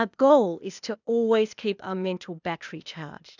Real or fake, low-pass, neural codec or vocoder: fake; 7.2 kHz; codec, 16 kHz, 0.8 kbps, ZipCodec